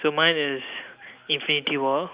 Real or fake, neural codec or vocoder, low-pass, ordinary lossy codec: real; none; 3.6 kHz; Opus, 64 kbps